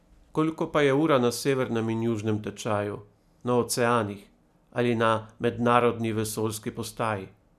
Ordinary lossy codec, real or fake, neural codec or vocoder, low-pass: none; real; none; 14.4 kHz